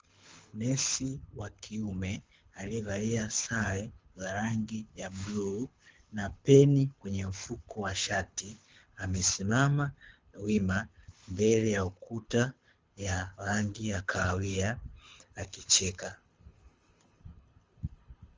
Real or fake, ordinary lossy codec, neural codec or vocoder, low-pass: fake; Opus, 32 kbps; codec, 24 kHz, 6 kbps, HILCodec; 7.2 kHz